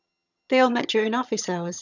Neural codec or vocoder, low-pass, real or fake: vocoder, 22.05 kHz, 80 mel bands, HiFi-GAN; 7.2 kHz; fake